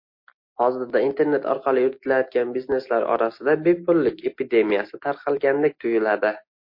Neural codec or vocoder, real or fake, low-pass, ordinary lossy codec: none; real; 5.4 kHz; MP3, 32 kbps